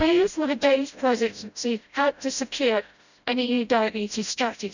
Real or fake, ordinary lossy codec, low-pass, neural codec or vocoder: fake; none; 7.2 kHz; codec, 16 kHz, 0.5 kbps, FreqCodec, smaller model